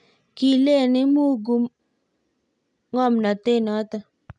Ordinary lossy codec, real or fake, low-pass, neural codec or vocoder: none; real; none; none